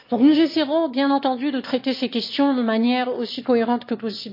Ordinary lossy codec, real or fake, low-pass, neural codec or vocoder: MP3, 32 kbps; fake; 5.4 kHz; autoencoder, 22.05 kHz, a latent of 192 numbers a frame, VITS, trained on one speaker